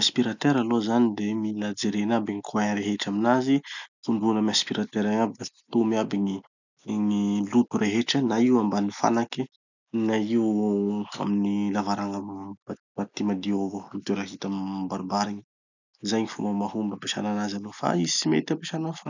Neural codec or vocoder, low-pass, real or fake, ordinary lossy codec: none; 7.2 kHz; real; none